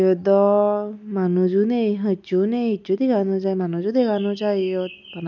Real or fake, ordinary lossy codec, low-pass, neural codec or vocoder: real; none; 7.2 kHz; none